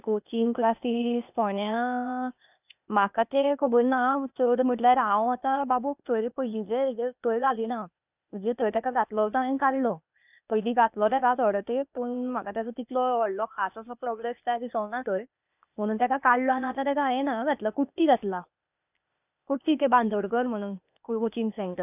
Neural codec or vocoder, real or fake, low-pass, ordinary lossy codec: codec, 16 kHz, 0.8 kbps, ZipCodec; fake; 3.6 kHz; none